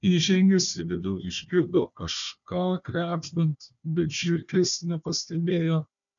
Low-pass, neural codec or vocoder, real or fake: 7.2 kHz; codec, 16 kHz, 1 kbps, FunCodec, trained on Chinese and English, 50 frames a second; fake